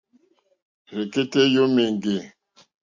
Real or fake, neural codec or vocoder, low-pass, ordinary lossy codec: real; none; 7.2 kHz; MP3, 48 kbps